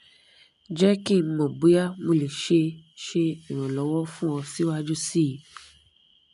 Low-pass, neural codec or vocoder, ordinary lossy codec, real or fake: 10.8 kHz; none; none; real